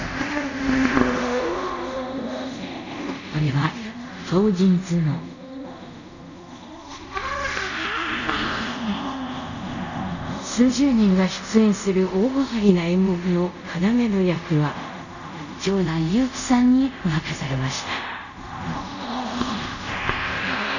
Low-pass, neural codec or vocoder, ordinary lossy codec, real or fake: 7.2 kHz; codec, 24 kHz, 0.5 kbps, DualCodec; none; fake